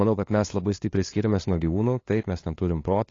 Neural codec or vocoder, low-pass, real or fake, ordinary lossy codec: codec, 16 kHz, 2 kbps, FunCodec, trained on LibriTTS, 25 frames a second; 7.2 kHz; fake; AAC, 32 kbps